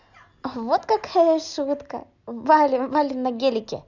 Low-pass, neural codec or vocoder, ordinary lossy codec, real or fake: 7.2 kHz; none; none; real